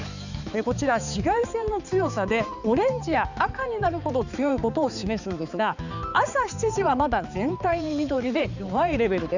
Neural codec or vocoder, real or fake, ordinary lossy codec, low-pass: codec, 16 kHz, 4 kbps, X-Codec, HuBERT features, trained on balanced general audio; fake; none; 7.2 kHz